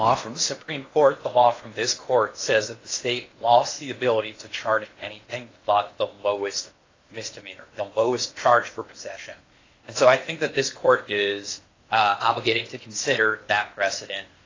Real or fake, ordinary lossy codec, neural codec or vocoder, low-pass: fake; AAC, 32 kbps; codec, 16 kHz in and 24 kHz out, 0.8 kbps, FocalCodec, streaming, 65536 codes; 7.2 kHz